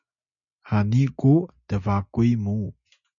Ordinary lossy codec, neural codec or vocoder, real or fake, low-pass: MP3, 96 kbps; none; real; 7.2 kHz